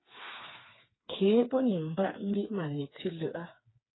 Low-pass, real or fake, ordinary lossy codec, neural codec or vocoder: 7.2 kHz; fake; AAC, 16 kbps; codec, 16 kHz, 4 kbps, FreqCodec, smaller model